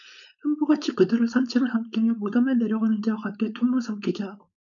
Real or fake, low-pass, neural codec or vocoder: fake; 7.2 kHz; codec, 16 kHz, 4.8 kbps, FACodec